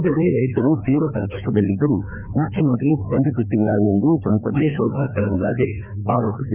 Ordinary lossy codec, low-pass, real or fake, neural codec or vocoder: none; 3.6 kHz; fake; codec, 16 kHz, 2 kbps, FreqCodec, larger model